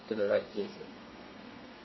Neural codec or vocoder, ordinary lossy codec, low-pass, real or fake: codec, 32 kHz, 1.9 kbps, SNAC; MP3, 24 kbps; 7.2 kHz; fake